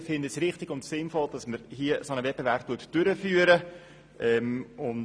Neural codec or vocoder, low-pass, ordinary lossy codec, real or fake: none; none; none; real